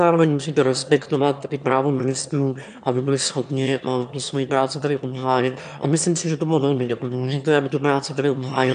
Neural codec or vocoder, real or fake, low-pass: autoencoder, 22.05 kHz, a latent of 192 numbers a frame, VITS, trained on one speaker; fake; 9.9 kHz